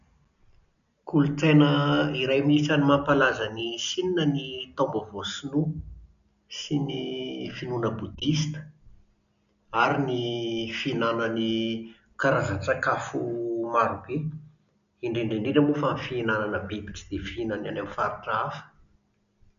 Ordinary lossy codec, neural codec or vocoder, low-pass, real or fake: none; none; 7.2 kHz; real